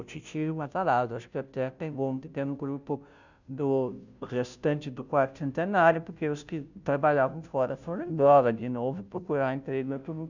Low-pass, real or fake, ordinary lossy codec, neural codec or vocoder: 7.2 kHz; fake; none; codec, 16 kHz, 0.5 kbps, FunCodec, trained on Chinese and English, 25 frames a second